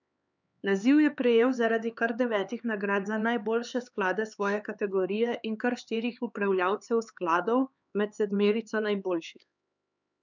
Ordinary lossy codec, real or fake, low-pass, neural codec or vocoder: none; fake; 7.2 kHz; codec, 16 kHz, 4 kbps, X-Codec, HuBERT features, trained on LibriSpeech